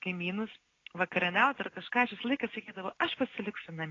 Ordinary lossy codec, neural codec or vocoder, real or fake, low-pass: AAC, 32 kbps; none; real; 7.2 kHz